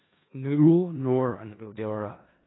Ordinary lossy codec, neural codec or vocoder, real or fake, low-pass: AAC, 16 kbps; codec, 16 kHz in and 24 kHz out, 0.4 kbps, LongCat-Audio-Codec, four codebook decoder; fake; 7.2 kHz